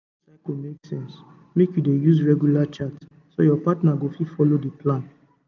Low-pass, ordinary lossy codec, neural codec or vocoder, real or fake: 7.2 kHz; none; none; real